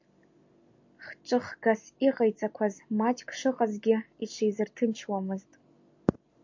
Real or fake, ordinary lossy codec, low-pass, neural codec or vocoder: real; MP3, 48 kbps; 7.2 kHz; none